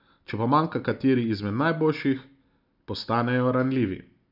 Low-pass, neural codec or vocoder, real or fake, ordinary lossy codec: 5.4 kHz; none; real; none